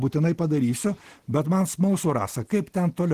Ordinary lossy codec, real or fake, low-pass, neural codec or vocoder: Opus, 16 kbps; real; 14.4 kHz; none